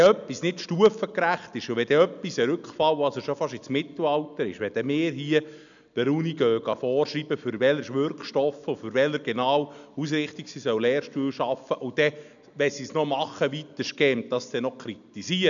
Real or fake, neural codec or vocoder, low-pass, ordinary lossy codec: real; none; 7.2 kHz; none